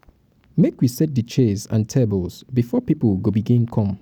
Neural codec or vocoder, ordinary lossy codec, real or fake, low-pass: none; none; real; 19.8 kHz